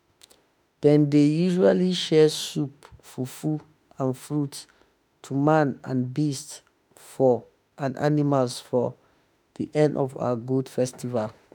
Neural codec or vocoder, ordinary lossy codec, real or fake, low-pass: autoencoder, 48 kHz, 32 numbers a frame, DAC-VAE, trained on Japanese speech; none; fake; none